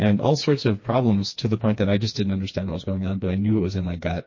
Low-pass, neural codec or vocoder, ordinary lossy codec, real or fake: 7.2 kHz; codec, 16 kHz, 2 kbps, FreqCodec, smaller model; MP3, 32 kbps; fake